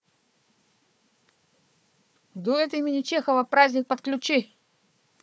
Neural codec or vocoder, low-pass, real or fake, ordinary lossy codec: codec, 16 kHz, 4 kbps, FunCodec, trained on Chinese and English, 50 frames a second; none; fake; none